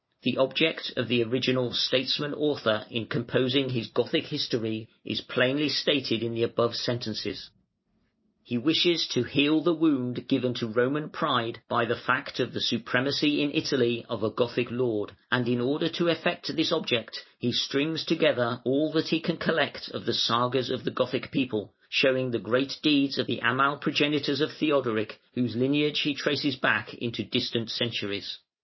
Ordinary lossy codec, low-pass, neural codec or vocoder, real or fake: MP3, 24 kbps; 7.2 kHz; none; real